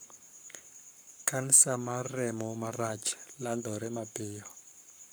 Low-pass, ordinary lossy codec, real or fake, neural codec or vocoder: none; none; fake; codec, 44.1 kHz, 7.8 kbps, Pupu-Codec